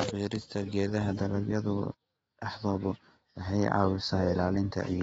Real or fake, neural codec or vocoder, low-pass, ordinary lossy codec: fake; autoencoder, 48 kHz, 128 numbers a frame, DAC-VAE, trained on Japanese speech; 19.8 kHz; AAC, 24 kbps